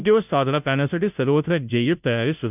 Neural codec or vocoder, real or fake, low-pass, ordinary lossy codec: codec, 16 kHz, 0.5 kbps, FunCodec, trained on Chinese and English, 25 frames a second; fake; 3.6 kHz; none